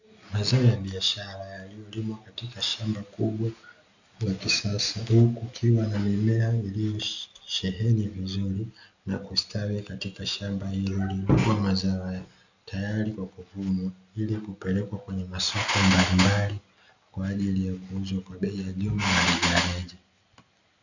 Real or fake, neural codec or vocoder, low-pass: real; none; 7.2 kHz